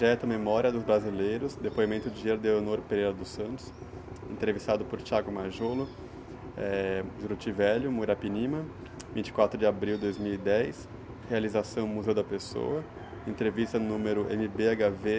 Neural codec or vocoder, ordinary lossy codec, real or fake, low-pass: none; none; real; none